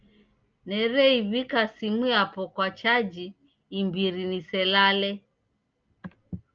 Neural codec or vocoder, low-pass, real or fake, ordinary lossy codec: none; 7.2 kHz; real; Opus, 24 kbps